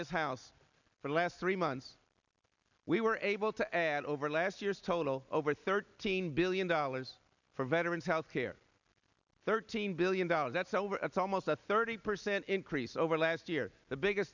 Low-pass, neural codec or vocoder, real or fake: 7.2 kHz; none; real